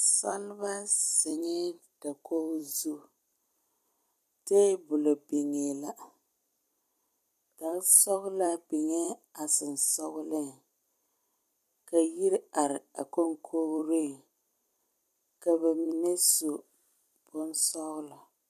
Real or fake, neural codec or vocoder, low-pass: fake; vocoder, 44.1 kHz, 128 mel bands every 256 samples, BigVGAN v2; 14.4 kHz